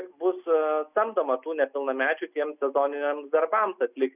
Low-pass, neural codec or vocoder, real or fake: 3.6 kHz; none; real